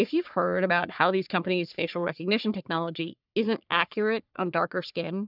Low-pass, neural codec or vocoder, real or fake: 5.4 kHz; codec, 44.1 kHz, 3.4 kbps, Pupu-Codec; fake